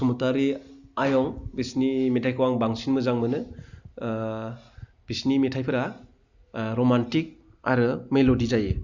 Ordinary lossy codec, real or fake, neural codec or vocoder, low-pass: Opus, 64 kbps; real; none; 7.2 kHz